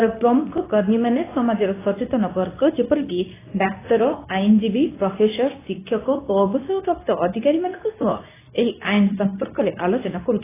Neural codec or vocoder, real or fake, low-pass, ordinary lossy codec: codec, 24 kHz, 0.9 kbps, WavTokenizer, medium speech release version 2; fake; 3.6 kHz; AAC, 16 kbps